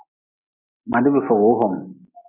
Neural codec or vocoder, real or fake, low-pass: none; real; 3.6 kHz